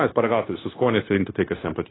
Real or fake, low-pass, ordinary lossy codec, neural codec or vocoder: fake; 7.2 kHz; AAC, 16 kbps; codec, 16 kHz, 0.9 kbps, LongCat-Audio-Codec